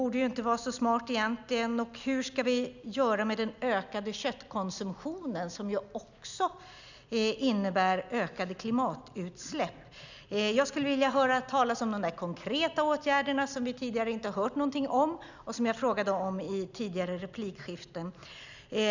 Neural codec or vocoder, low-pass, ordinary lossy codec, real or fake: none; 7.2 kHz; none; real